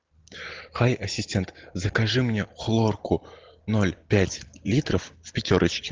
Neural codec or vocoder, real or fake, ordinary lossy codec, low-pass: none; real; Opus, 16 kbps; 7.2 kHz